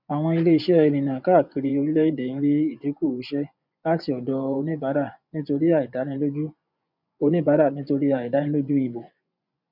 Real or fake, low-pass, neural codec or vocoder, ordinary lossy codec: fake; 5.4 kHz; vocoder, 24 kHz, 100 mel bands, Vocos; none